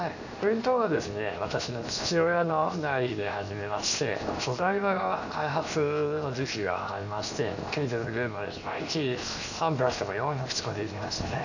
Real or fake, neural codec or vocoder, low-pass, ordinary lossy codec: fake; codec, 16 kHz, 0.7 kbps, FocalCodec; 7.2 kHz; none